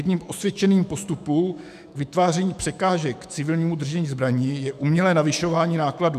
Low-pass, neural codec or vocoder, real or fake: 14.4 kHz; autoencoder, 48 kHz, 128 numbers a frame, DAC-VAE, trained on Japanese speech; fake